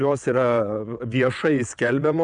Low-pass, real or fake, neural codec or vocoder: 9.9 kHz; fake; vocoder, 22.05 kHz, 80 mel bands, WaveNeXt